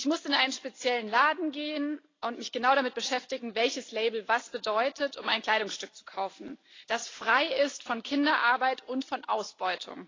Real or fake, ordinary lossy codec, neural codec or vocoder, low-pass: real; AAC, 32 kbps; none; 7.2 kHz